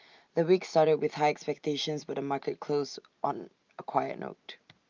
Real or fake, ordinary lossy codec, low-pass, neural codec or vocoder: real; Opus, 24 kbps; 7.2 kHz; none